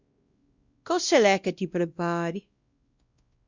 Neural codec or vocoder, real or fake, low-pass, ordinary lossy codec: codec, 16 kHz, 1 kbps, X-Codec, WavLM features, trained on Multilingual LibriSpeech; fake; 7.2 kHz; Opus, 64 kbps